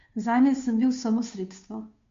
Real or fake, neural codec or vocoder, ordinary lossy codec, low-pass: fake; codec, 16 kHz, 2 kbps, FunCodec, trained on Chinese and English, 25 frames a second; MP3, 48 kbps; 7.2 kHz